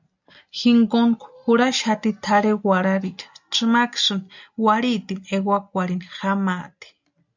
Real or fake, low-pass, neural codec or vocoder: real; 7.2 kHz; none